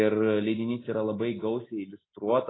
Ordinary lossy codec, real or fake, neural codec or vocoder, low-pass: AAC, 16 kbps; real; none; 7.2 kHz